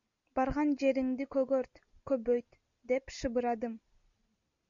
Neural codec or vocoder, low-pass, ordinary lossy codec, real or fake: none; 7.2 kHz; MP3, 64 kbps; real